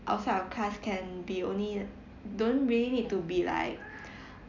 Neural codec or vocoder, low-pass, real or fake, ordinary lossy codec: none; 7.2 kHz; real; none